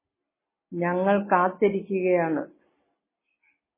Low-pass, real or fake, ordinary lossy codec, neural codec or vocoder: 3.6 kHz; real; MP3, 16 kbps; none